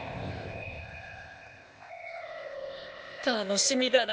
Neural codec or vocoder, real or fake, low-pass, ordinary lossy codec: codec, 16 kHz, 0.8 kbps, ZipCodec; fake; none; none